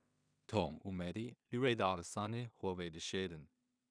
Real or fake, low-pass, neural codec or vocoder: fake; 9.9 kHz; codec, 16 kHz in and 24 kHz out, 0.4 kbps, LongCat-Audio-Codec, two codebook decoder